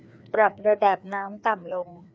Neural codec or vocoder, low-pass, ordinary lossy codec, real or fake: codec, 16 kHz, 2 kbps, FreqCodec, larger model; none; none; fake